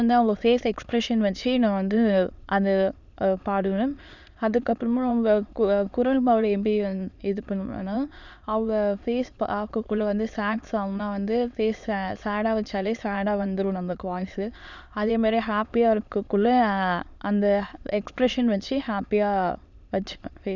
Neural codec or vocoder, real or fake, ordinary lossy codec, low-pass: autoencoder, 22.05 kHz, a latent of 192 numbers a frame, VITS, trained on many speakers; fake; none; 7.2 kHz